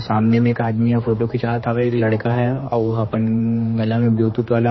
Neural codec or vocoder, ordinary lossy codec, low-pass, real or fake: codec, 16 kHz, 2 kbps, X-Codec, HuBERT features, trained on general audio; MP3, 24 kbps; 7.2 kHz; fake